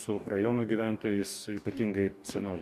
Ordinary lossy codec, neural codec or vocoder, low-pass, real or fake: MP3, 96 kbps; codec, 44.1 kHz, 2.6 kbps, DAC; 14.4 kHz; fake